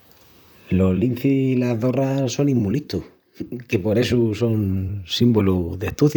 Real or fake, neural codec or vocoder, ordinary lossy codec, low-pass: fake; vocoder, 44.1 kHz, 128 mel bands, Pupu-Vocoder; none; none